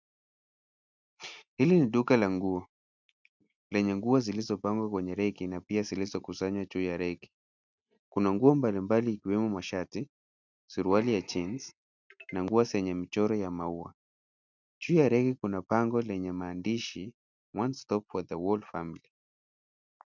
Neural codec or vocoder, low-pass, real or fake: none; 7.2 kHz; real